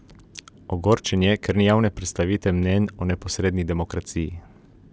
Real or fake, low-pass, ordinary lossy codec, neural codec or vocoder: real; none; none; none